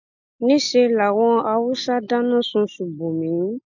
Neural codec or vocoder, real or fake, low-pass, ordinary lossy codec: none; real; 7.2 kHz; none